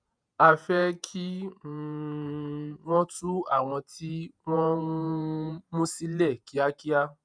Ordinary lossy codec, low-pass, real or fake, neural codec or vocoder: none; 9.9 kHz; fake; vocoder, 48 kHz, 128 mel bands, Vocos